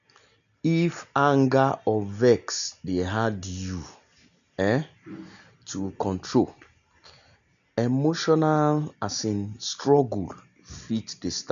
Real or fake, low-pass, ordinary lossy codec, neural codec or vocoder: real; 7.2 kHz; none; none